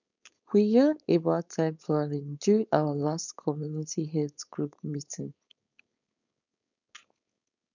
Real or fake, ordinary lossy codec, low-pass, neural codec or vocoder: fake; none; 7.2 kHz; codec, 16 kHz, 4.8 kbps, FACodec